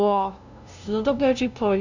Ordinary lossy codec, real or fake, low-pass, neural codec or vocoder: none; fake; 7.2 kHz; codec, 16 kHz, 0.5 kbps, FunCodec, trained on LibriTTS, 25 frames a second